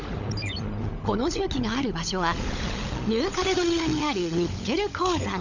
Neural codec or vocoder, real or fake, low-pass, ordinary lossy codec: codec, 16 kHz, 16 kbps, FunCodec, trained on LibriTTS, 50 frames a second; fake; 7.2 kHz; none